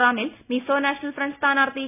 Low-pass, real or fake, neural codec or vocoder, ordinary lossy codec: 3.6 kHz; real; none; none